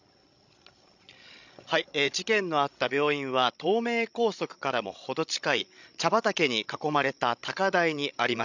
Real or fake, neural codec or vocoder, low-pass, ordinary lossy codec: fake; codec, 16 kHz, 16 kbps, FreqCodec, larger model; 7.2 kHz; none